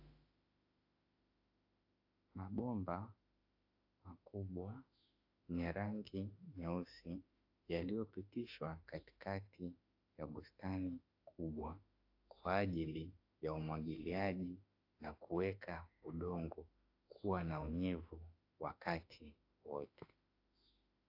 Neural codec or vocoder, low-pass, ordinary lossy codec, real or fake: autoencoder, 48 kHz, 32 numbers a frame, DAC-VAE, trained on Japanese speech; 5.4 kHz; AAC, 32 kbps; fake